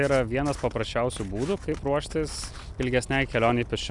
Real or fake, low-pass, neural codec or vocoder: real; 10.8 kHz; none